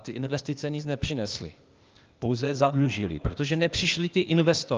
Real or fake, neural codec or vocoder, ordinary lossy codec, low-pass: fake; codec, 16 kHz, 0.8 kbps, ZipCodec; Opus, 24 kbps; 7.2 kHz